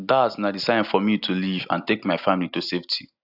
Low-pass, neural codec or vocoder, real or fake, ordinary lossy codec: 5.4 kHz; none; real; AAC, 48 kbps